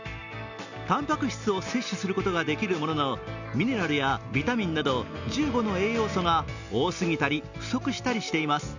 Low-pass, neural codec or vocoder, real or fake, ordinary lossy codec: 7.2 kHz; none; real; none